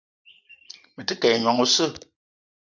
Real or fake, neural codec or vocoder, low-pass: real; none; 7.2 kHz